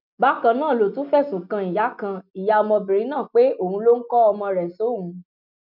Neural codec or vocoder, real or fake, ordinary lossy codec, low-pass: none; real; none; 5.4 kHz